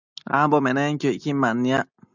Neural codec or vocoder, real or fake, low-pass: none; real; 7.2 kHz